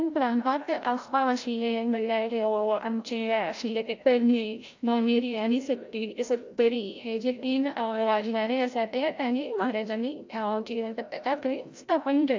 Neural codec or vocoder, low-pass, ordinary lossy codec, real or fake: codec, 16 kHz, 0.5 kbps, FreqCodec, larger model; 7.2 kHz; AAC, 48 kbps; fake